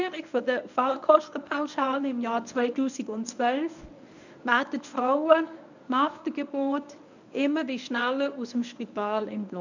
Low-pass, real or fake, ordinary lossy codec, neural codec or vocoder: 7.2 kHz; fake; none; codec, 24 kHz, 0.9 kbps, WavTokenizer, medium speech release version 1